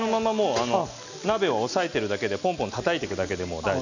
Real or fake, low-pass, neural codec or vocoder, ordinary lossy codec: real; 7.2 kHz; none; none